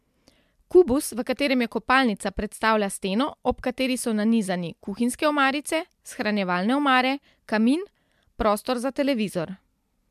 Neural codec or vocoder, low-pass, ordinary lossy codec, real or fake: none; 14.4 kHz; MP3, 96 kbps; real